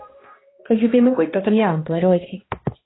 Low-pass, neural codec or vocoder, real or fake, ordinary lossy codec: 7.2 kHz; codec, 16 kHz, 1 kbps, X-Codec, HuBERT features, trained on balanced general audio; fake; AAC, 16 kbps